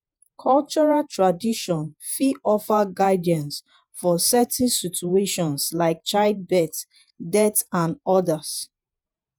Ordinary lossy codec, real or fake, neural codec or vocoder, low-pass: none; fake; vocoder, 48 kHz, 128 mel bands, Vocos; none